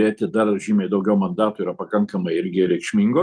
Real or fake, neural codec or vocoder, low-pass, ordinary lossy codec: real; none; 9.9 kHz; Opus, 32 kbps